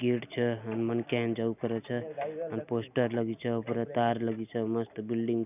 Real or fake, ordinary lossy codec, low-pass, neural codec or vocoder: real; none; 3.6 kHz; none